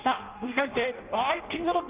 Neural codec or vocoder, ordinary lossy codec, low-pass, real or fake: codec, 16 kHz in and 24 kHz out, 0.6 kbps, FireRedTTS-2 codec; Opus, 32 kbps; 3.6 kHz; fake